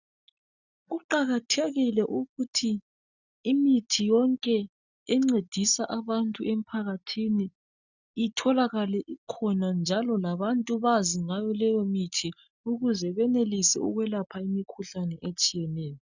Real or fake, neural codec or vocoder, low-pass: real; none; 7.2 kHz